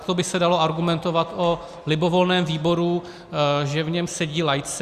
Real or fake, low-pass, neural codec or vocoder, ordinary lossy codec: real; 14.4 kHz; none; Opus, 64 kbps